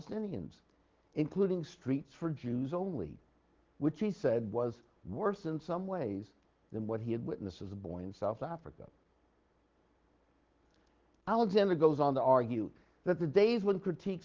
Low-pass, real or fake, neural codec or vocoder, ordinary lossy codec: 7.2 kHz; real; none; Opus, 16 kbps